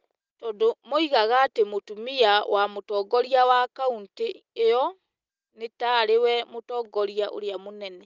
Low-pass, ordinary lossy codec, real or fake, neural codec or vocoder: 7.2 kHz; Opus, 24 kbps; real; none